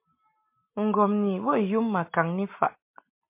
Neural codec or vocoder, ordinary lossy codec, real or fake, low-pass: none; MP3, 24 kbps; real; 3.6 kHz